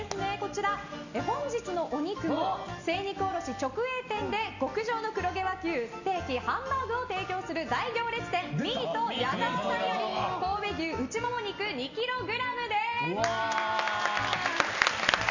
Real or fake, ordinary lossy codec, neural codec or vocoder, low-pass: real; none; none; 7.2 kHz